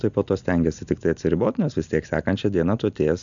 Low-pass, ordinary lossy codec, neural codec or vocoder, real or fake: 7.2 kHz; MP3, 96 kbps; none; real